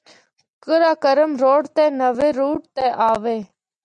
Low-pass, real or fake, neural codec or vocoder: 9.9 kHz; real; none